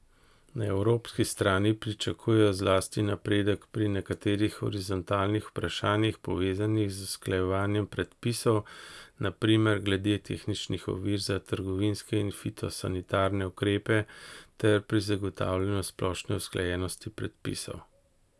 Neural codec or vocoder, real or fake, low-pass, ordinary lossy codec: none; real; none; none